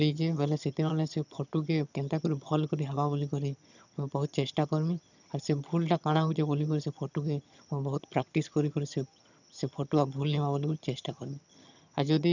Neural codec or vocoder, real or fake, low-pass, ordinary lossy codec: vocoder, 22.05 kHz, 80 mel bands, HiFi-GAN; fake; 7.2 kHz; none